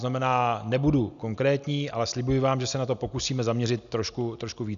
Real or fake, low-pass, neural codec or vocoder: real; 7.2 kHz; none